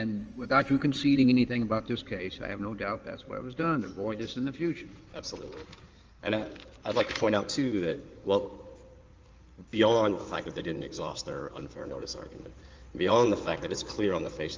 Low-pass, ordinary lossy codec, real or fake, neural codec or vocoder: 7.2 kHz; Opus, 24 kbps; fake; codec, 16 kHz in and 24 kHz out, 2.2 kbps, FireRedTTS-2 codec